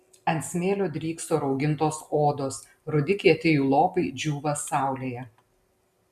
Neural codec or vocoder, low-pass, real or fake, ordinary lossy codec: none; 14.4 kHz; real; MP3, 96 kbps